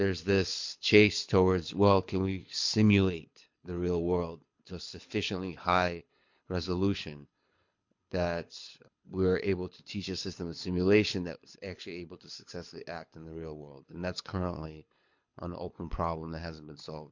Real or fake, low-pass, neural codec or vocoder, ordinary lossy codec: fake; 7.2 kHz; codec, 24 kHz, 6 kbps, HILCodec; MP3, 48 kbps